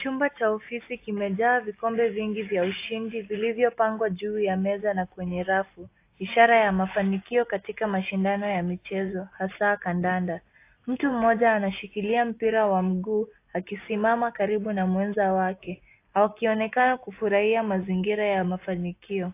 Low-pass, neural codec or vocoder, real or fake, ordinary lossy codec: 3.6 kHz; none; real; AAC, 24 kbps